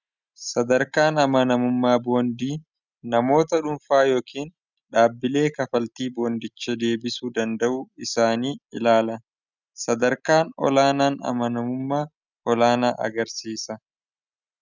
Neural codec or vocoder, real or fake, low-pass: none; real; 7.2 kHz